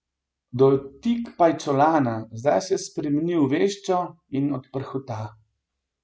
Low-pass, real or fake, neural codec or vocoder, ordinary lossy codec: none; real; none; none